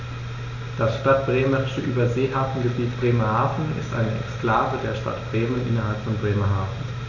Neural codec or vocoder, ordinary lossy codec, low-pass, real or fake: none; none; 7.2 kHz; real